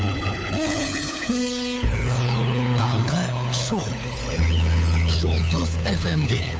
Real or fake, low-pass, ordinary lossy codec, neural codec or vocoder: fake; none; none; codec, 16 kHz, 4 kbps, FunCodec, trained on LibriTTS, 50 frames a second